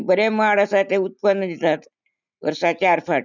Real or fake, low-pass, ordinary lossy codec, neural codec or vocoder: real; 7.2 kHz; none; none